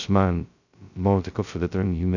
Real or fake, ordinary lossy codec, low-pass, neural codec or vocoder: fake; none; 7.2 kHz; codec, 16 kHz, 0.2 kbps, FocalCodec